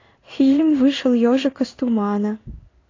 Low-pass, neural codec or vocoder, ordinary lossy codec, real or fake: 7.2 kHz; codec, 16 kHz in and 24 kHz out, 1 kbps, XY-Tokenizer; AAC, 32 kbps; fake